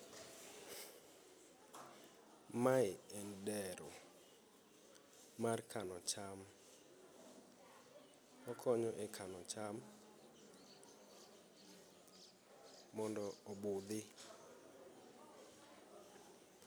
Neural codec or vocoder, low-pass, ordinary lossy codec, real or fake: none; none; none; real